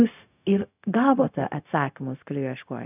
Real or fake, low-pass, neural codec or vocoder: fake; 3.6 kHz; codec, 16 kHz, 0.4 kbps, LongCat-Audio-Codec